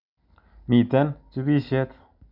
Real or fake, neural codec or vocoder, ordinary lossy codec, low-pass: real; none; none; 5.4 kHz